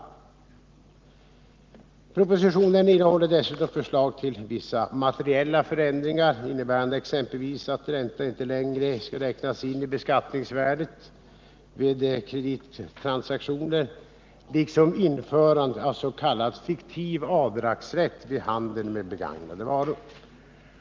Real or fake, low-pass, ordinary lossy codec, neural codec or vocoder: real; 7.2 kHz; Opus, 32 kbps; none